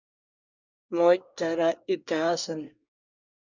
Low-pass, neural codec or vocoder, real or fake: 7.2 kHz; codec, 24 kHz, 1 kbps, SNAC; fake